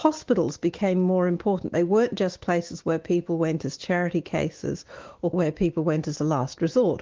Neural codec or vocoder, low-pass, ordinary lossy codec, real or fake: autoencoder, 48 kHz, 128 numbers a frame, DAC-VAE, trained on Japanese speech; 7.2 kHz; Opus, 16 kbps; fake